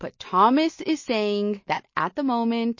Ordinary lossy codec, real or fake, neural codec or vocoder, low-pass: MP3, 32 kbps; real; none; 7.2 kHz